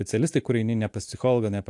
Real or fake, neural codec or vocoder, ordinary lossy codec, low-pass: real; none; AAC, 64 kbps; 10.8 kHz